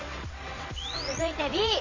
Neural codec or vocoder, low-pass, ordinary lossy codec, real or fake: vocoder, 22.05 kHz, 80 mel bands, WaveNeXt; 7.2 kHz; AAC, 32 kbps; fake